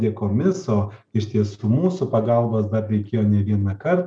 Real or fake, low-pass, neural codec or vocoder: real; 9.9 kHz; none